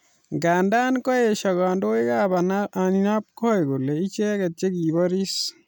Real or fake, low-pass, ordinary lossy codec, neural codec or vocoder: real; none; none; none